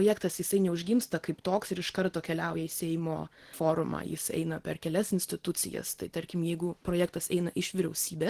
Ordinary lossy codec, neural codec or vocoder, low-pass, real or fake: Opus, 16 kbps; none; 14.4 kHz; real